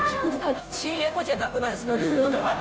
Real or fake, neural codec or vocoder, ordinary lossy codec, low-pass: fake; codec, 16 kHz, 0.5 kbps, FunCodec, trained on Chinese and English, 25 frames a second; none; none